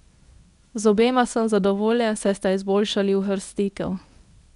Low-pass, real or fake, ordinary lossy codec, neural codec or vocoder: 10.8 kHz; fake; MP3, 96 kbps; codec, 24 kHz, 0.9 kbps, WavTokenizer, medium speech release version 1